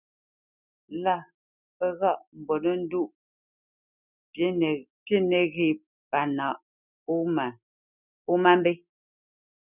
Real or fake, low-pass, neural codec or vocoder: real; 3.6 kHz; none